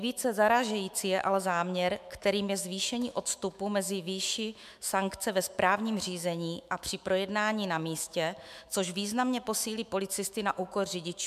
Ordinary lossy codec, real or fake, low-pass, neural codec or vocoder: MP3, 96 kbps; fake; 14.4 kHz; autoencoder, 48 kHz, 128 numbers a frame, DAC-VAE, trained on Japanese speech